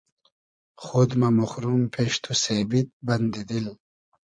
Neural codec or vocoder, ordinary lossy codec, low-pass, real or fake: vocoder, 22.05 kHz, 80 mel bands, Vocos; MP3, 64 kbps; 9.9 kHz; fake